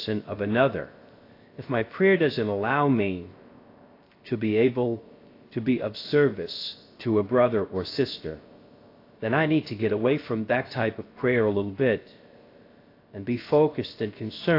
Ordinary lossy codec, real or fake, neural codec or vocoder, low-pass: AAC, 32 kbps; fake; codec, 16 kHz, 0.3 kbps, FocalCodec; 5.4 kHz